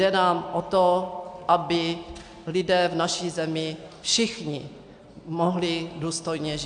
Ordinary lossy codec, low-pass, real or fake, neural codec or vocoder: AAC, 64 kbps; 9.9 kHz; real; none